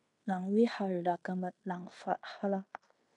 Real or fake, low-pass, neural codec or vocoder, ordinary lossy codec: fake; 10.8 kHz; codec, 16 kHz in and 24 kHz out, 0.9 kbps, LongCat-Audio-Codec, fine tuned four codebook decoder; MP3, 64 kbps